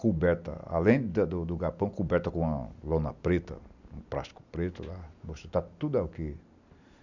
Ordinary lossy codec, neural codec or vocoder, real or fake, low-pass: none; none; real; 7.2 kHz